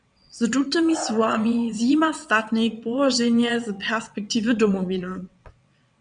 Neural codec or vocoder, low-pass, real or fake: vocoder, 22.05 kHz, 80 mel bands, WaveNeXt; 9.9 kHz; fake